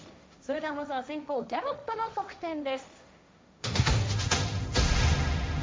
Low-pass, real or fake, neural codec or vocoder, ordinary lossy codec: none; fake; codec, 16 kHz, 1.1 kbps, Voila-Tokenizer; none